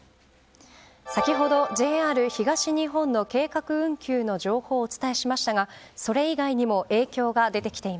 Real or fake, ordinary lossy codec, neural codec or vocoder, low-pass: real; none; none; none